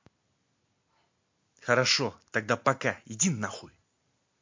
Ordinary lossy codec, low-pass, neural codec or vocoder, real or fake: MP3, 48 kbps; 7.2 kHz; none; real